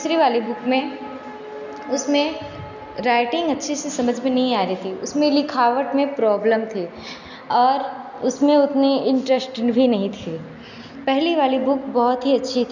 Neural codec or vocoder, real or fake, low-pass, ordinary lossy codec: none; real; 7.2 kHz; none